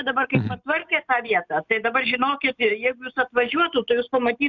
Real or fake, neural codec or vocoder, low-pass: real; none; 7.2 kHz